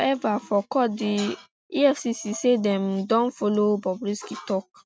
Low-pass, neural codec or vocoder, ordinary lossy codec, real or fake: none; none; none; real